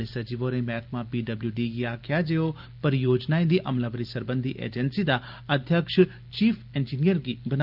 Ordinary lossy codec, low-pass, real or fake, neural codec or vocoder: Opus, 24 kbps; 5.4 kHz; real; none